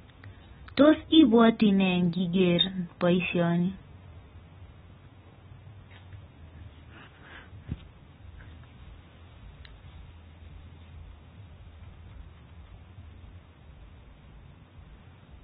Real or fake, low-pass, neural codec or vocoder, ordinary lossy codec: real; 7.2 kHz; none; AAC, 16 kbps